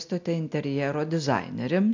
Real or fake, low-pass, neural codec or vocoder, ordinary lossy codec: real; 7.2 kHz; none; MP3, 64 kbps